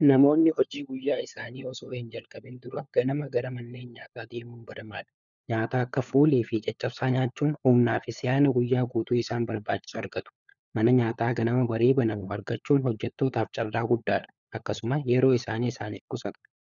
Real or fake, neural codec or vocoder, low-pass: fake; codec, 16 kHz, 4 kbps, FunCodec, trained on LibriTTS, 50 frames a second; 7.2 kHz